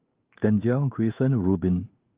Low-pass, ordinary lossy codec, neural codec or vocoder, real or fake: 3.6 kHz; Opus, 24 kbps; codec, 16 kHz in and 24 kHz out, 1 kbps, XY-Tokenizer; fake